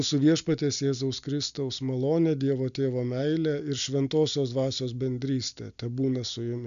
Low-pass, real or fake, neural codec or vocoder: 7.2 kHz; real; none